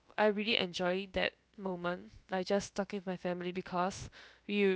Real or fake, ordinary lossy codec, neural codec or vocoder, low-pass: fake; none; codec, 16 kHz, about 1 kbps, DyCAST, with the encoder's durations; none